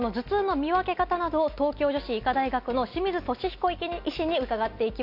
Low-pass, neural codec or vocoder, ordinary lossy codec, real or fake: 5.4 kHz; none; none; real